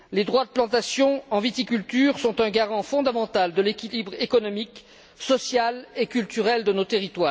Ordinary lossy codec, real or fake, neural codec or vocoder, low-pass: none; real; none; none